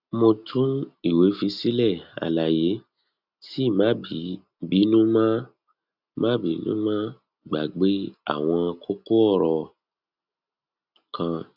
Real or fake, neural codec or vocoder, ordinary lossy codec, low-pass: real; none; none; 5.4 kHz